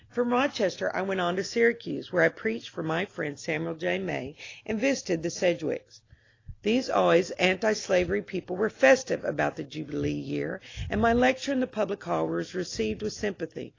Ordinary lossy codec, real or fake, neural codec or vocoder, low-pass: AAC, 32 kbps; real; none; 7.2 kHz